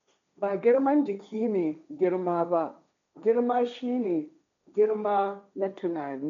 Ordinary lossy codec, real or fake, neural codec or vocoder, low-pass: MP3, 64 kbps; fake; codec, 16 kHz, 1.1 kbps, Voila-Tokenizer; 7.2 kHz